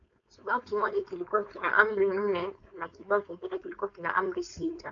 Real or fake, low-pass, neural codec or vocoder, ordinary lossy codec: fake; 7.2 kHz; codec, 16 kHz, 4.8 kbps, FACodec; MP3, 64 kbps